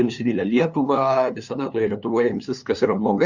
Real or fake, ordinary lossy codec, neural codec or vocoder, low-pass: fake; Opus, 64 kbps; codec, 16 kHz, 2 kbps, FunCodec, trained on LibriTTS, 25 frames a second; 7.2 kHz